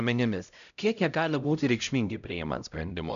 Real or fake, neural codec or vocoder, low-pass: fake; codec, 16 kHz, 0.5 kbps, X-Codec, HuBERT features, trained on LibriSpeech; 7.2 kHz